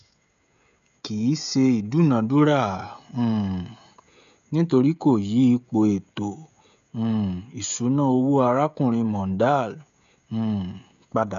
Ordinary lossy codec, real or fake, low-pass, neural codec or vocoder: none; fake; 7.2 kHz; codec, 16 kHz, 16 kbps, FreqCodec, smaller model